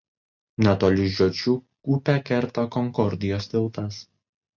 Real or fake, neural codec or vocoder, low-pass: real; none; 7.2 kHz